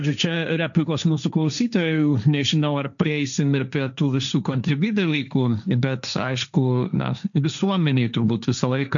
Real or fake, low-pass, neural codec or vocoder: fake; 7.2 kHz; codec, 16 kHz, 1.1 kbps, Voila-Tokenizer